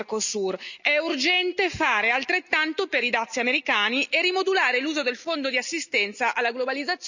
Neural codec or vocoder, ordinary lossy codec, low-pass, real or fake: none; none; 7.2 kHz; real